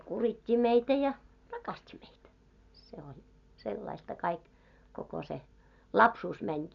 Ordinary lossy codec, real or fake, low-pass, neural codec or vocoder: none; real; 7.2 kHz; none